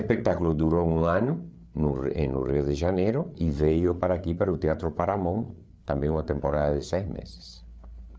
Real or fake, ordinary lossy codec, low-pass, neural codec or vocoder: fake; none; none; codec, 16 kHz, 8 kbps, FreqCodec, larger model